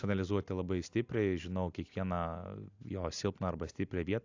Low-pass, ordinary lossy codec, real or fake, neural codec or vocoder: 7.2 kHz; AAC, 48 kbps; real; none